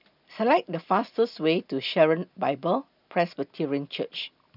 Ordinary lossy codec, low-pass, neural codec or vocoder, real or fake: none; 5.4 kHz; none; real